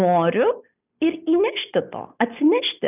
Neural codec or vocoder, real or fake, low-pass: none; real; 3.6 kHz